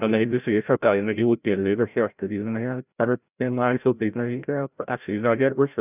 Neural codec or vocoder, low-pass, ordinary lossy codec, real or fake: codec, 16 kHz, 0.5 kbps, FreqCodec, larger model; 3.6 kHz; none; fake